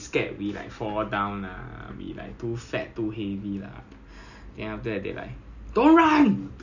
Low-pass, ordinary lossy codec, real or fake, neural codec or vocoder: 7.2 kHz; none; real; none